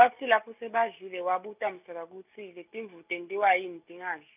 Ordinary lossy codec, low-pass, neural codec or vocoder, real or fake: none; 3.6 kHz; none; real